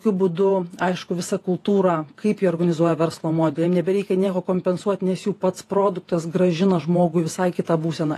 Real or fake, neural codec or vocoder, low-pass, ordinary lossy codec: fake; vocoder, 48 kHz, 128 mel bands, Vocos; 14.4 kHz; AAC, 48 kbps